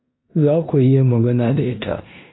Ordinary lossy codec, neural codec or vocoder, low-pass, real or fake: AAC, 16 kbps; codec, 16 kHz in and 24 kHz out, 0.9 kbps, LongCat-Audio-Codec, four codebook decoder; 7.2 kHz; fake